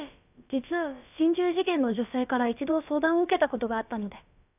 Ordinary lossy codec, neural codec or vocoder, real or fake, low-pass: none; codec, 16 kHz, about 1 kbps, DyCAST, with the encoder's durations; fake; 3.6 kHz